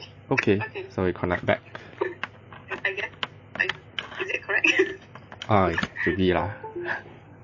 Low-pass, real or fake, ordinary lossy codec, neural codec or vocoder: 7.2 kHz; real; MP3, 32 kbps; none